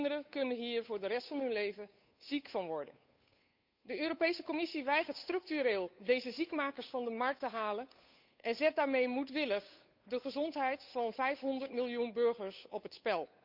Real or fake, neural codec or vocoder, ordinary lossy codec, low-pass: fake; codec, 16 kHz, 8 kbps, FunCodec, trained on Chinese and English, 25 frames a second; none; 5.4 kHz